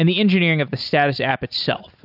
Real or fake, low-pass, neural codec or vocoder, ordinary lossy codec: real; 5.4 kHz; none; AAC, 48 kbps